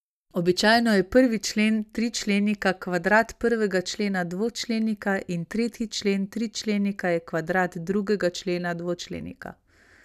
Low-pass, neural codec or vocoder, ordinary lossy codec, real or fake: 14.4 kHz; none; none; real